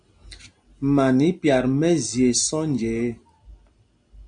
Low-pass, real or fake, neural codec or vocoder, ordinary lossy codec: 9.9 kHz; real; none; MP3, 64 kbps